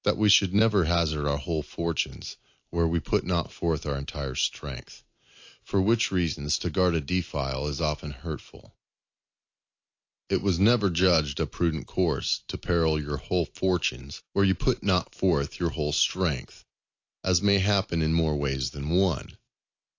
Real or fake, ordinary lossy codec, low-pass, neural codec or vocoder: real; AAC, 48 kbps; 7.2 kHz; none